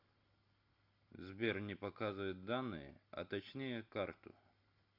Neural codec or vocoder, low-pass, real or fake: none; 5.4 kHz; real